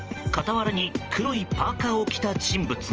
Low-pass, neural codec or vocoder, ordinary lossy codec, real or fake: 7.2 kHz; vocoder, 44.1 kHz, 128 mel bands every 512 samples, BigVGAN v2; Opus, 24 kbps; fake